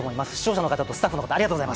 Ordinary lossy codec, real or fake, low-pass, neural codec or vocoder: none; real; none; none